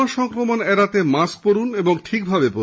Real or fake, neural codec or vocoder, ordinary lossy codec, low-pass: real; none; none; none